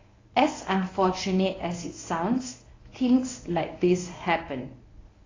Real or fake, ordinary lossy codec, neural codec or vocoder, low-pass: fake; AAC, 32 kbps; codec, 24 kHz, 0.9 kbps, WavTokenizer, medium speech release version 1; 7.2 kHz